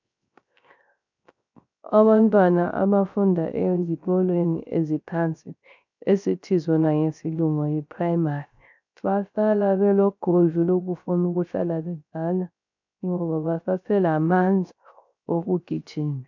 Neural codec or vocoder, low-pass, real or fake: codec, 16 kHz, 0.3 kbps, FocalCodec; 7.2 kHz; fake